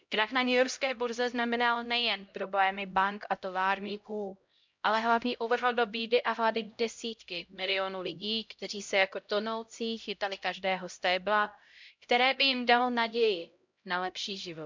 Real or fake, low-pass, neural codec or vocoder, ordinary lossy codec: fake; 7.2 kHz; codec, 16 kHz, 0.5 kbps, X-Codec, HuBERT features, trained on LibriSpeech; MP3, 64 kbps